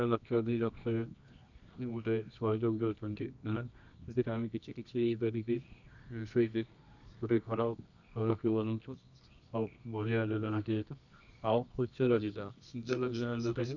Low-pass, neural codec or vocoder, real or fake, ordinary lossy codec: 7.2 kHz; codec, 24 kHz, 0.9 kbps, WavTokenizer, medium music audio release; fake; none